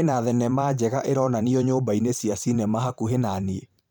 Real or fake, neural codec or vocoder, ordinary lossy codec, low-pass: fake; vocoder, 44.1 kHz, 128 mel bands every 512 samples, BigVGAN v2; none; none